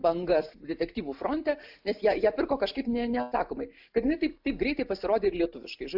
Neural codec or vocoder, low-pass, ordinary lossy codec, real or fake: none; 5.4 kHz; AAC, 48 kbps; real